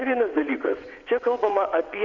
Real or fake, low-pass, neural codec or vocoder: fake; 7.2 kHz; vocoder, 22.05 kHz, 80 mel bands, WaveNeXt